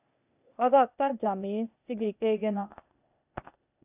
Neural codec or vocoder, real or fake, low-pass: codec, 16 kHz, 0.8 kbps, ZipCodec; fake; 3.6 kHz